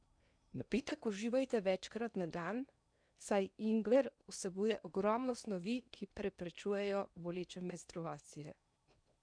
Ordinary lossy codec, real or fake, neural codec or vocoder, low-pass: none; fake; codec, 16 kHz in and 24 kHz out, 0.8 kbps, FocalCodec, streaming, 65536 codes; 9.9 kHz